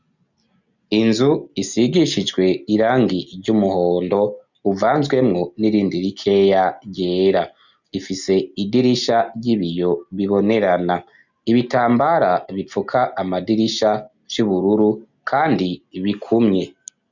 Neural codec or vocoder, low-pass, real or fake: none; 7.2 kHz; real